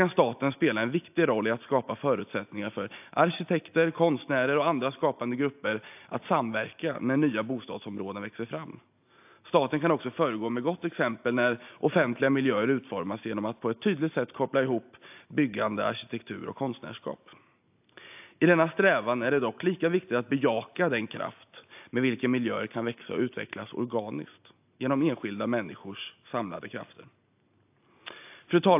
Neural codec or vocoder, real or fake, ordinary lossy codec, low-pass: none; real; AAC, 32 kbps; 3.6 kHz